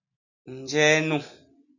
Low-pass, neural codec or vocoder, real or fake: 7.2 kHz; none; real